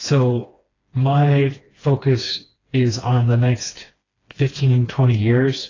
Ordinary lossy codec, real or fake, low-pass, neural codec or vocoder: AAC, 32 kbps; fake; 7.2 kHz; codec, 16 kHz, 2 kbps, FreqCodec, smaller model